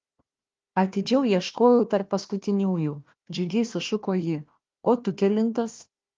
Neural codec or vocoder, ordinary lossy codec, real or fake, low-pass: codec, 16 kHz, 1 kbps, FunCodec, trained on Chinese and English, 50 frames a second; Opus, 32 kbps; fake; 7.2 kHz